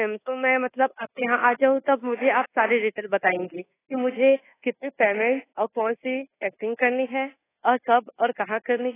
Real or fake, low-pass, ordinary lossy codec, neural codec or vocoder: fake; 3.6 kHz; AAC, 16 kbps; codec, 24 kHz, 1.2 kbps, DualCodec